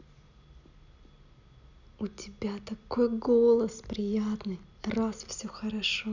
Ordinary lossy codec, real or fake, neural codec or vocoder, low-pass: none; real; none; 7.2 kHz